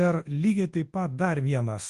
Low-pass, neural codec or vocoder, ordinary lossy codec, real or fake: 10.8 kHz; codec, 24 kHz, 0.9 kbps, WavTokenizer, large speech release; Opus, 24 kbps; fake